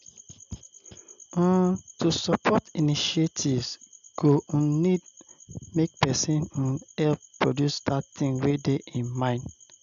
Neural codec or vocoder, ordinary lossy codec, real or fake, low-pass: none; none; real; 7.2 kHz